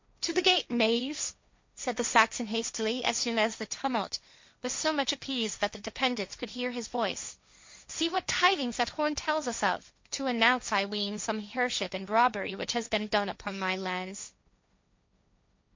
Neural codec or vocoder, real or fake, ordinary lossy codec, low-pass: codec, 16 kHz, 1.1 kbps, Voila-Tokenizer; fake; MP3, 48 kbps; 7.2 kHz